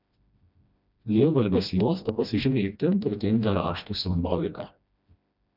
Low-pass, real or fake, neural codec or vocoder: 5.4 kHz; fake; codec, 16 kHz, 1 kbps, FreqCodec, smaller model